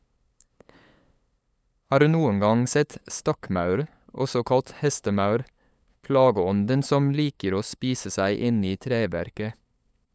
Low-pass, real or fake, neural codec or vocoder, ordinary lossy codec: none; fake; codec, 16 kHz, 8 kbps, FunCodec, trained on LibriTTS, 25 frames a second; none